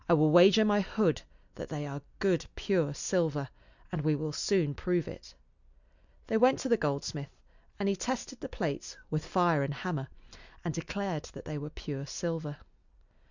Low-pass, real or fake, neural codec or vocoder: 7.2 kHz; real; none